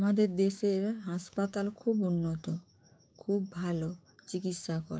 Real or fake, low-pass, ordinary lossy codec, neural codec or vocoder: fake; none; none; codec, 16 kHz, 6 kbps, DAC